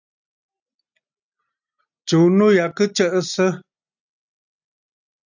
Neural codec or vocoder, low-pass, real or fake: none; 7.2 kHz; real